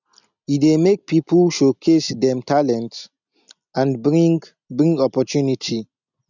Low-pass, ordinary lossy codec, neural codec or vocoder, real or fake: 7.2 kHz; none; none; real